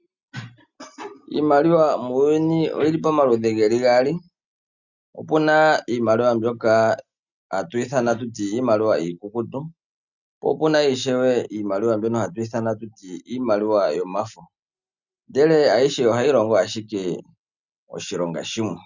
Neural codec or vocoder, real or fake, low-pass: none; real; 7.2 kHz